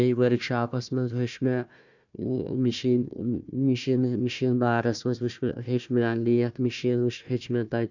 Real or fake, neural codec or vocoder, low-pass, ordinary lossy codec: fake; codec, 16 kHz, 1 kbps, FunCodec, trained on Chinese and English, 50 frames a second; 7.2 kHz; none